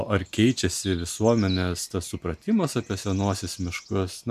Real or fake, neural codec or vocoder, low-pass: real; none; 14.4 kHz